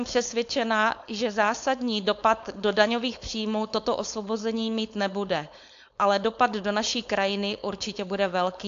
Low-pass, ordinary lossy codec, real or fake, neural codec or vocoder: 7.2 kHz; AAC, 64 kbps; fake; codec, 16 kHz, 4.8 kbps, FACodec